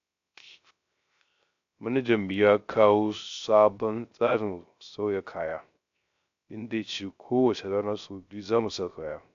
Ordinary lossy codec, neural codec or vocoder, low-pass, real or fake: AAC, 64 kbps; codec, 16 kHz, 0.3 kbps, FocalCodec; 7.2 kHz; fake